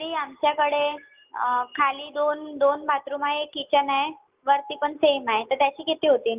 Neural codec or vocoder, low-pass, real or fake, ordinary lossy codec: none; 3.6 kHz; real; Opus, 32 kbps